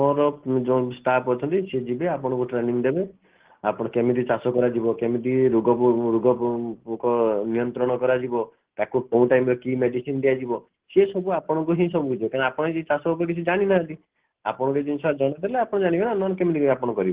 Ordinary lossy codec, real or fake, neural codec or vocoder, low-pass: Opus, 16 kbps; real; none; 3.6 kHz